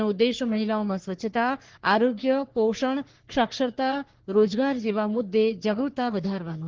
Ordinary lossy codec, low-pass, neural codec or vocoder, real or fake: Opus, 16 kbps; 7.2 kHz; codec, 44.1 kHz, 3.4 kbps, Pupu-Codec; fake